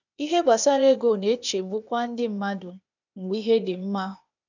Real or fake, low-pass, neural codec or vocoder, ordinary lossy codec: fake; 7.2 kHz; codec, 16 kHz, 0.8 kbps, ZipCodec; none